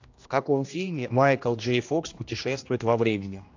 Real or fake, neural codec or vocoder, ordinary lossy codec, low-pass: fake; codec, 16 kHz, 1 kbps, X-Codec, HuBERT features, trained on general audio; AAC, 48 kbps; 7.2 kHz